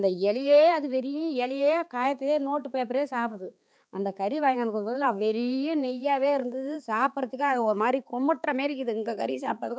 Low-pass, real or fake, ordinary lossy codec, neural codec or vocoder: none; fake; none; codec, 16 kHz, 4 kbps, X-Codec, HuBERT features, trained on balanced general audio